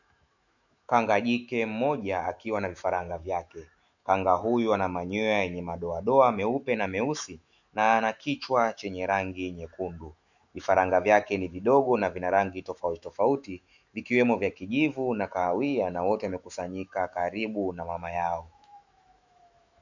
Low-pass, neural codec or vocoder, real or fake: 7.2 kHz; autoencoder, 48 kHz, 128 numbers a frame, DAC-VAE, trained on Japanese speech; fake